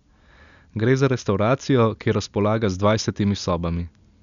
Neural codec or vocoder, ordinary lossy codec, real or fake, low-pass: none; none; real; 7.2 kHz